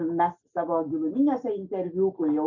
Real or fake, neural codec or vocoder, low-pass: real; none; 7.2 kHz